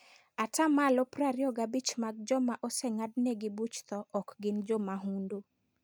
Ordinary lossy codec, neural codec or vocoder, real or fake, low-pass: none; none; real; none